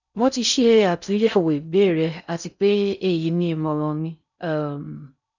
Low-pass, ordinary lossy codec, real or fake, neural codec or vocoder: 7.2 kHz; none; fake; codec, 16 kHz in and 24 kHz out, 0.6 kbps, FocalCodec, streaming, 4096 codes